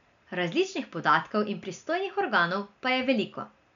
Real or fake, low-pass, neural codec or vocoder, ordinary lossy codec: real; 7.2 kHz; none; none